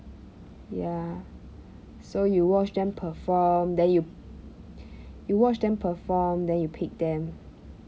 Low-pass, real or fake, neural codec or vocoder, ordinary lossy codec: none; real; none; none